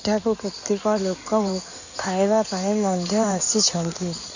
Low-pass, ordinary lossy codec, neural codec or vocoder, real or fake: 7.2 kHz; none; codec, 16 kHz in and 24 kHz out, 2.2 kbps, FireRedTTS-2 codec; fake